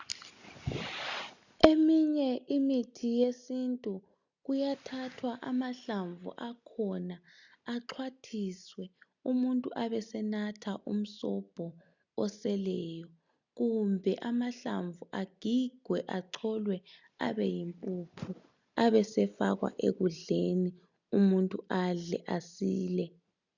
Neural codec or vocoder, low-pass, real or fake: none; 7.2 kHz; real